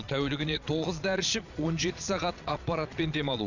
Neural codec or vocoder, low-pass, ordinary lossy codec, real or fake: vocoder, 22.05 kHz, 80 mel bands, Vocos; 7.2 kHz; none; fake